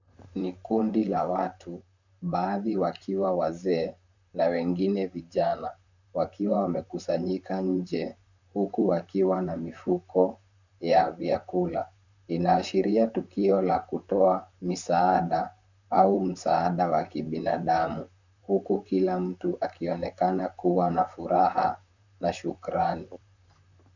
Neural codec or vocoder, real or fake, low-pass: vocoder, 44.1 kHz, 128 mel bands, Pupu-Vocoder; fake; 7.2 kHz